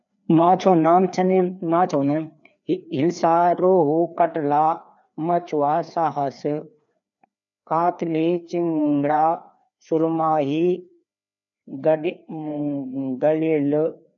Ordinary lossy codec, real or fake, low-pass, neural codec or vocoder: none; fake; 7.2 kHz; codec, 16 kHz, 2 kbps, FreqCodec, larger model